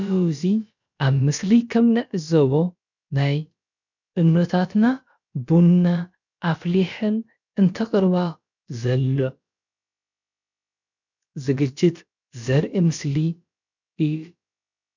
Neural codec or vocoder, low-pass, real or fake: codec, 16 kHz, about 1 kbps, DyCAST, with the encoder's durations; 7.2 kHz; fake